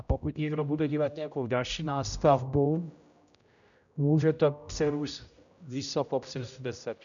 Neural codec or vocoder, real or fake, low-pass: codec, 16 kHz, 0.5 kbps, X-Codec, HuBERT features, trained on general audio; fake; 7.2 kHz